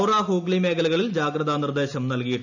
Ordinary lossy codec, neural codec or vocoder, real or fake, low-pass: none; none; real; 7.2 kHz